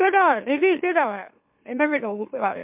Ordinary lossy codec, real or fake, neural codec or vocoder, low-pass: MP3, 32 kbps; fake; autoencoder, 44.1 kHz, a latent of 192 numbers a frame, MeloTTS; 3.6 kHz